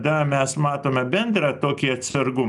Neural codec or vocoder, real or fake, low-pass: none; real; 10.8 kHz